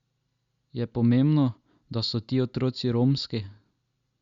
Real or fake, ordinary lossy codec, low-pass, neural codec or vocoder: real; Opus, 64 kbps; 7.2 kHz; none